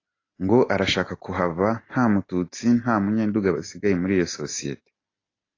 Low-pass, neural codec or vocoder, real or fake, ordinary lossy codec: 7.2 kHz; none; real; AAC, 32 kbps